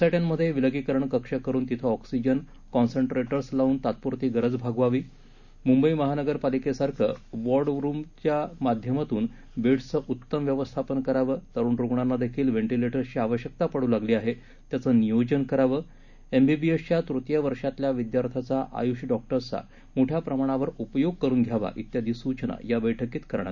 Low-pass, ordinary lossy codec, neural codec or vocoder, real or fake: 7.2 kHz; none; none; real